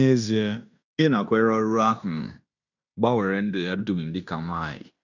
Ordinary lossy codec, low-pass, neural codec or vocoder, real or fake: none; 7.2 kHz; codec, 16 kHz in and 24 kHz out, 0.9 kbps, LongCat-Audio-Codec, fine tuned four codebook decoder; fake